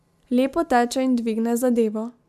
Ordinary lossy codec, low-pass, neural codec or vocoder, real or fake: MP3, 96 kbps; 14.4 kHz; none; real